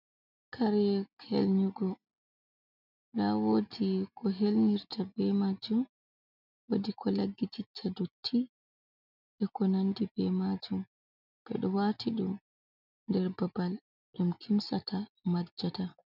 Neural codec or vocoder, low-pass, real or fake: none; 5.4 kHz; real